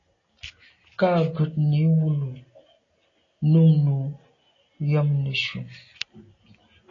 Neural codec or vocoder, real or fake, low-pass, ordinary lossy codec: none; real; 7.2 kHz; AAC, 32 kbps